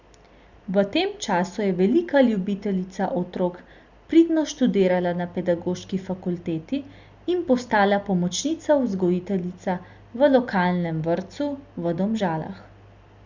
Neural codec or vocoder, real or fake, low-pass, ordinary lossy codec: none; real; 7.2 kHz; Opus, 64 kbps